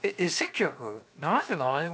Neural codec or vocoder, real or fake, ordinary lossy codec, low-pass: codec, 16 kHz, 0.7 kbps, FocalCodec; fake; none; none